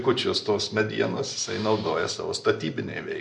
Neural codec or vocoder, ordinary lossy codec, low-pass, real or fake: none; MP3, 64 kbps; 10.8 kHz; real